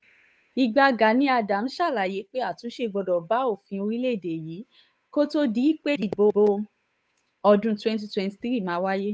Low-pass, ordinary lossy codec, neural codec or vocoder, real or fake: none; none; codec, 16 kHz, 8 kbps, FunCodec, trained on Chinese and English, 25 frames a second; fake